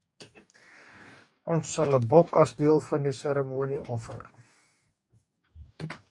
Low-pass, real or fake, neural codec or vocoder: 10.8 kHz; fake; codec, 44.1 kHz, 2.6 kbps, DAC